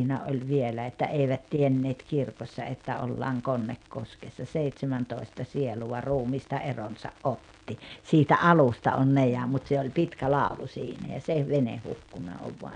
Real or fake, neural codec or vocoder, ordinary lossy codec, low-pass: real; none; none; 9.9 kHz